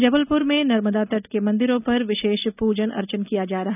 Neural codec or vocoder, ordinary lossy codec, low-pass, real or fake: none; none; 3.6 kHz; real